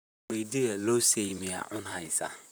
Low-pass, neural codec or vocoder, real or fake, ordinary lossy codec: none; vocoder, 44.1 kHz, 128 mel bands, Pupu-Vocoder; fake; none